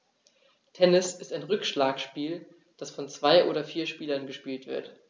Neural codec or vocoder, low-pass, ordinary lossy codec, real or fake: none; none; none; real